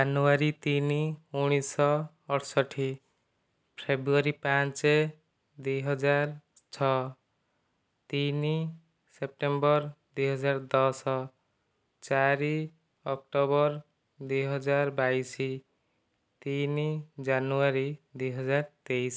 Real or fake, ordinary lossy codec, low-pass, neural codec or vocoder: real; none; none; none